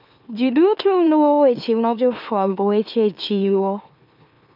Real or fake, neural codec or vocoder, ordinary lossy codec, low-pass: fake; autoencoder, 44.1 kHz, a latent of 192 numbers a frame, MeloTTS; none; 5.4 kHz